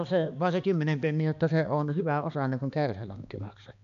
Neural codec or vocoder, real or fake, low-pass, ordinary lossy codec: codec, 16 kHz, 2 kbps, X-Codec, HuBERT features, trained on balanced general audio; fake; 7.2 kHz; none